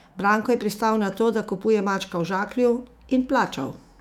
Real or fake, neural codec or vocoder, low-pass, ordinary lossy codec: fake; autoencoder, 48 kHz, 128 numbers a frame, DAC-VAE, trained on Japanese speech; 19.8 kHz; none